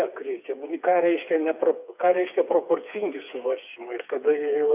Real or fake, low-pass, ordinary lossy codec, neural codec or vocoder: fake; 3.6 kHz; AAC, 32 kbps; codec, 16 kHz, 4 kbps, FreqCodec, smaller model